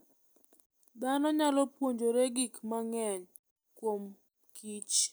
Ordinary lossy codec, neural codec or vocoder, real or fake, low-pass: none; none; real; none